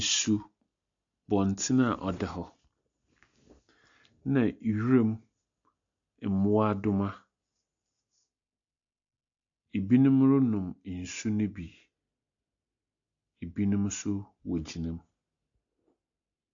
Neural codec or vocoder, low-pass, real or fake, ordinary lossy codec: none; 7.2 kHz; real; AAC, 64 kbps